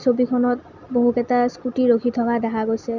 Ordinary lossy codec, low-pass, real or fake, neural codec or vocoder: none; 7.2 kHz; real; none